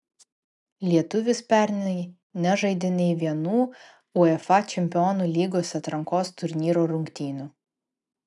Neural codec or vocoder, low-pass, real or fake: none; 10.8 kHz; real